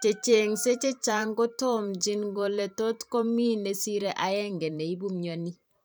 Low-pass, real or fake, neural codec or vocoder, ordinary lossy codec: none; fake; vocoder, 44.1 kHz, 128 mel bands every 512 samples, BigVGAN v2; none